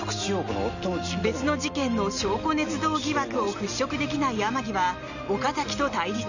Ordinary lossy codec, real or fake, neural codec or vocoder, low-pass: none; real; none; 7.2 kHz